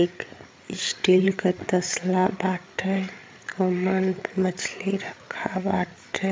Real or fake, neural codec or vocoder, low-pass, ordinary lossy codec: fake; codec, 16 kHz, 8 kbps, FreqCodec, larger model; none; none